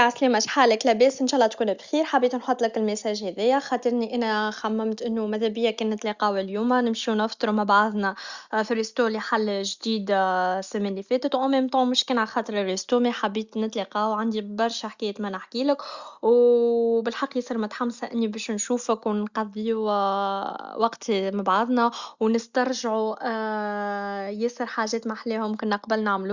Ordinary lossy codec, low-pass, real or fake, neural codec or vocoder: Opus, 64 kbps; 7.2 kHz; real; none